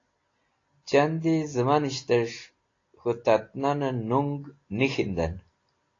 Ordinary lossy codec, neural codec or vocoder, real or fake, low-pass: AAC, 32 kbps; none; real; 7.2 kHz